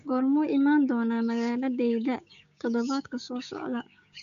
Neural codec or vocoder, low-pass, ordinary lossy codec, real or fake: codec, 16 kHz, 6 kbps, DAC; 7.2 kHz; none; fake